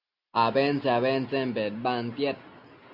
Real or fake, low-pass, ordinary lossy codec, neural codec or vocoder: real; 5.4 kHz; Opus, 64 kbps; none